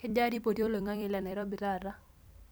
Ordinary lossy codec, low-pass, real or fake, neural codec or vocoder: none; none; fake; vocoder, 44.1 kHz, 128 mel bands every 256 samples, BigVGAN v2